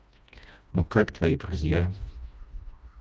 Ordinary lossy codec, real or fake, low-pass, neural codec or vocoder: none; fake; none; codec, 16 kHz, 1 kbps, FreqCodec, smaller model